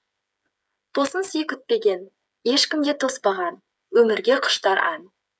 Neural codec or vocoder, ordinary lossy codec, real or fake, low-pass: codec, 16 kHz, 8 kbps, FreqCodec, smaller model; none; fake; none